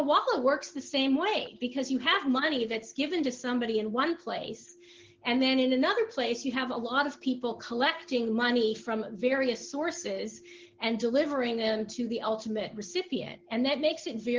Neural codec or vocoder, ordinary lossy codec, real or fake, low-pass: none; Opus, 16 kbps; real; 7.2 kHz